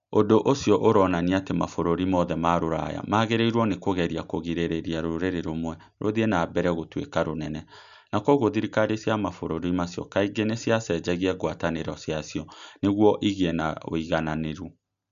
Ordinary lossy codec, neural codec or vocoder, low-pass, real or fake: none; none; 7.2 kHz; real